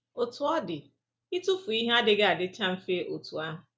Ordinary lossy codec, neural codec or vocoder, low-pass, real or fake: none; none; none; real